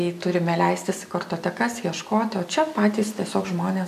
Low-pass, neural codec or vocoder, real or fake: 14.4 kHz; none; real